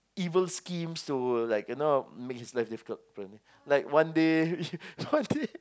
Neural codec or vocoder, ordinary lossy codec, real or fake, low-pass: none; none; real; none